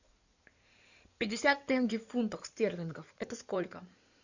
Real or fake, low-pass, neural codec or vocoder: fake; 7.2 kHz; codec, 16 kHz in and 24 kHz out, 2.2 kbps, FireRedTTS-2 codec